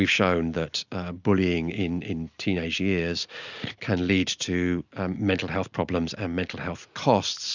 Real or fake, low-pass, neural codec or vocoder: real; 7.2 kHz; none